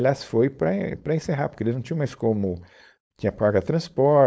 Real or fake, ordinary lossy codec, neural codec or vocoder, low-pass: fake; none; codec, 16 kHz, 4.8 kbps, FACodec; none